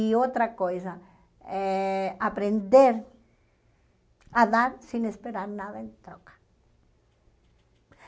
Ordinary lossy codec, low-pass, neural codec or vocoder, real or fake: none; none; none; real